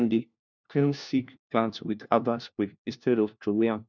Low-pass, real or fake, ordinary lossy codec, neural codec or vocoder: 7.2 kHz; fake; none; codec, 16 kHz, 1 kbps, FunCodec, trained on LibriTTS, 50 frames a second